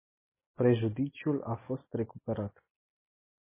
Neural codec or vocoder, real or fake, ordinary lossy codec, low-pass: none; real; MP3, 16 kbps; 3.6 kHz